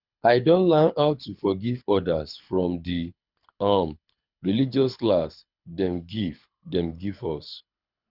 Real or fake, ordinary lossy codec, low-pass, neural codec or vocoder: fake; Opus, 64 kbps; 5.4 kHz; codec, 24 kHz, 6 kbps, HILCodec